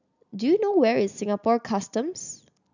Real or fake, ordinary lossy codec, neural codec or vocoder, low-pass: real; none; none; 7.2 kHz